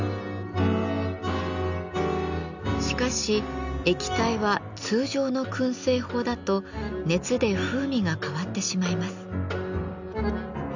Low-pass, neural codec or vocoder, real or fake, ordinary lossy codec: 7.2 kHz; none; real; none